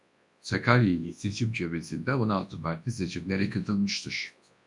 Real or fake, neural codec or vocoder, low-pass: fake; codec, 24 kHz, 0.9 kbps, WavTokenizer, large speech release; 10.8 kHz